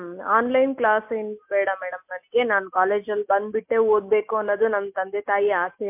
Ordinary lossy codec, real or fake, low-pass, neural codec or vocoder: MP3, 32 kbps; real; 3.6 kHz; none